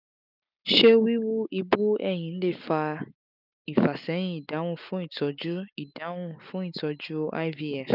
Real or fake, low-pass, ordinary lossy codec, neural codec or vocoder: real; 5.4 kHz; none; none